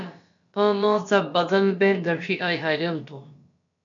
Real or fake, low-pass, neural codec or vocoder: fake; 7.2 kHz; codec, 16 kHz, about 1 kbps, DyCAST, with the encoder's durations